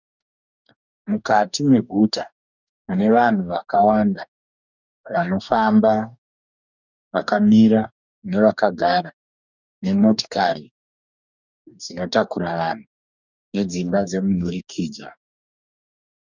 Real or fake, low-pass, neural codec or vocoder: fake; 7.2 kHz; codec, 44.1 kHz, 2.6 kbps, DAC